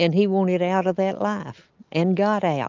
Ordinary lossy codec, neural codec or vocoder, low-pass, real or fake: Opus, 24 kbps; none; 7.2 kHz; real